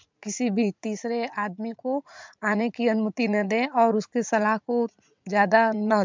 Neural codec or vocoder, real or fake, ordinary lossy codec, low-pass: vocoder, 44.1 kHz, 80 mel bands, Vocos; fake; MP3, 64 kbps; 7.2 kHz